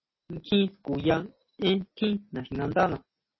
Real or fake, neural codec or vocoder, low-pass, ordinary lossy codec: real; none; 7.2 kHz; MP3, 24 kbps